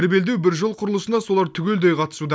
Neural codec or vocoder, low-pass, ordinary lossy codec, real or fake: none; none; none; real